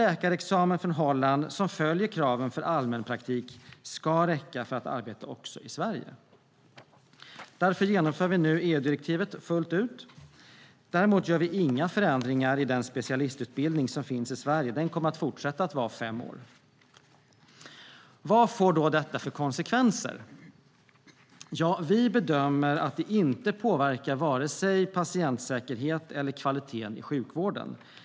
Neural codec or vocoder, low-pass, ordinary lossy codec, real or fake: none; none; none; real